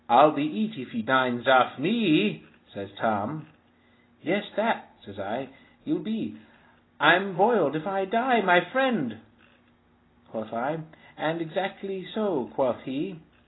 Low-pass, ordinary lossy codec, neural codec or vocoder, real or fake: 7.2 kHz; AAC, 16 kbps; none; real